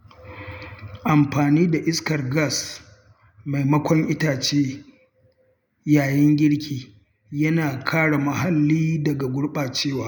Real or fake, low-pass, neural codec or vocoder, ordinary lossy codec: real; 19.8 kHz; none; none